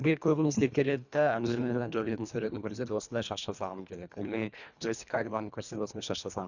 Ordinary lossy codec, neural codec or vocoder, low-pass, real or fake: none; codec, 24 kHz, 1.5 kbps, HILCodec; 7.2 kHz; fake